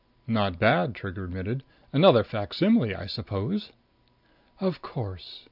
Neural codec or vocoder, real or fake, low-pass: none; real; 5.4 kHz